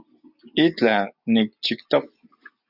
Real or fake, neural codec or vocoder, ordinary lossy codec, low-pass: real; none; Opus, 64 kbps; 5.4 kHz